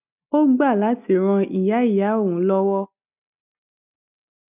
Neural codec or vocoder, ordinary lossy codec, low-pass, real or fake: none; none; 3.6 kHz; real